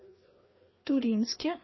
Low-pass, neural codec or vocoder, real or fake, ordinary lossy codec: 7.2 kHz; codec, 16 kHz, 2 kbps, FreqCodec, larger model; fake; MP3, 24 kbps